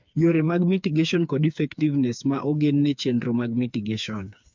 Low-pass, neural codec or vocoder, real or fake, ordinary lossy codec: 7.2 kHz; codec, 16 kHz, 4 kbps, FreqCodec, smaller model; fake; MP3, 64 kbps